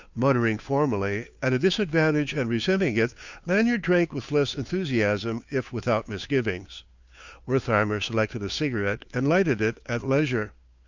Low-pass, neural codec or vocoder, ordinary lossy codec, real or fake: 7.2 kHz; codec, 16 kHz, 2 kbps, FunCodec, trained on Chinese and English, 25 frames a second; Opus, 64 kbps; fake